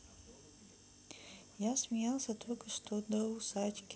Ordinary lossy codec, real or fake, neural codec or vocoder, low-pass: none; real; none; none